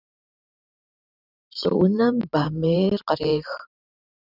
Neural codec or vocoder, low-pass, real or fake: vocoder, 44.1 kHz, 128 mel bands every 512 samples, BigVGAN v2; 5.4 kHz; fake